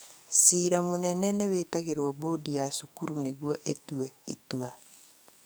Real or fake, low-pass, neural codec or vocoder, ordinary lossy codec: fake; none; codec, 44.1 kHz, 2.6 kbps, SNAC; none